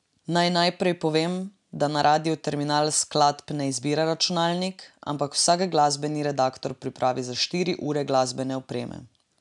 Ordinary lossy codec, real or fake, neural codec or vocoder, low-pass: none; real; none; 10.8 kHz